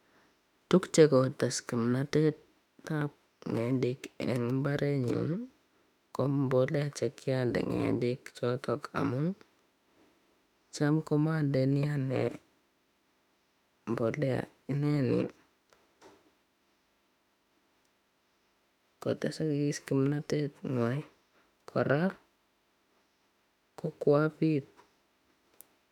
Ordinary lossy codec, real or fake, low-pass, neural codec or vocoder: none; fake; 19.8 kHz; autoencoder, 48 kHz, 32 numbers a frame, DAC-VAE, trained on Japanese speech